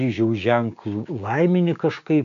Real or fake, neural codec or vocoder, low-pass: fake; codec, 16 kHz, 6 kbps, DAC; 7.2 kHz